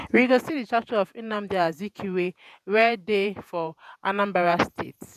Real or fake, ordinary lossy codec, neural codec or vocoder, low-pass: fake; none; vocoder, 48 kHz, 128 mel bands, Vocos; 14.4 kHz